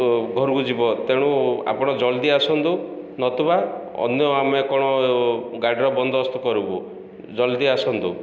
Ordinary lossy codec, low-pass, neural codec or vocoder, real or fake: none; none; none; real